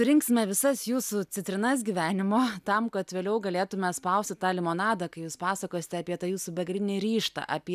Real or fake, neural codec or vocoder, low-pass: real; none; 14.4 kHz